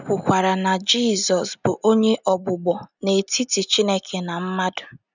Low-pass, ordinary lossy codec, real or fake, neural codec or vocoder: 7.2 kHz; none; real; none